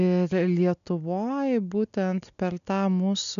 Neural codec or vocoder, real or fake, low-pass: none; real; 7.2 kHz